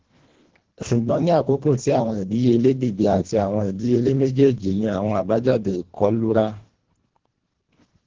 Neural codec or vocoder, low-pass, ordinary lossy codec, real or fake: codec, 24 kHz, 1.5 kbps, HILCodec; 7.2 kHz; Opus, 16 kbps; fake